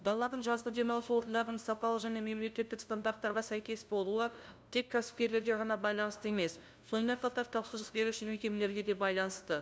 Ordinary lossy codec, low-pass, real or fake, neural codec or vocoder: none; none; fake; codec, 16 kHz, 0.5 kbps, FunCodec, trained on LibriTTS, 25 frames a second